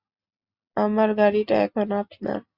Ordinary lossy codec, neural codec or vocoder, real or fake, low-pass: Opus, 64 kbps; none; real; 5.4 kHz